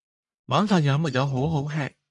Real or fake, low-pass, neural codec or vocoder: fake; 10.8 kHz; codec, 44.1 kHz, 1.7 kbps, Pupu-Codec